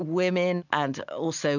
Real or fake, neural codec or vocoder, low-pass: real; none; 7.2 kHz